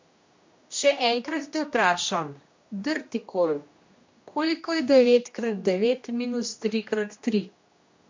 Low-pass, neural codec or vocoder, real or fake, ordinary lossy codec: 7.2 kHz; codec, 16 kHz, 1 kbps, X-Codec, HuBERT features, trained on general audio; fake; MP3, 48 kbps